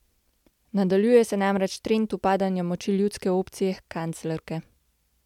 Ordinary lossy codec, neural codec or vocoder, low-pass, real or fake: MP3, 96 kbps; none; 19.8 kHz; real